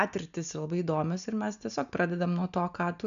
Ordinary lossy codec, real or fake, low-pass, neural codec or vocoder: MP3, 96 kbps; real; 7.2 kHz; none